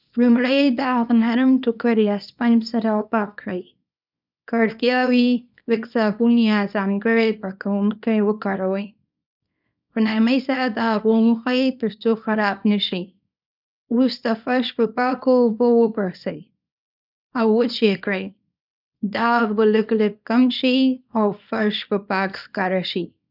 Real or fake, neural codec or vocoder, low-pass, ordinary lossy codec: fake; codec, 24 kHz, 0.9 kbps, WavTokenizer, small release; 5.4 kHz; none